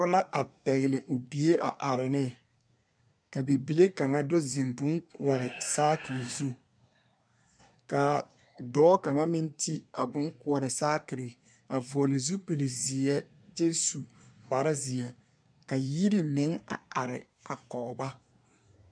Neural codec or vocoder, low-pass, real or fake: codec, 24 kHz, 1 kbps, SNAC; 9.9 kHz; fake